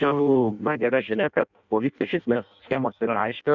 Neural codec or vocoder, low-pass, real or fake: codec, 16 kHz in and 24 kHz out, 0.6 kbps, FireRedTTS-2 codec; 7.2 kHz; fake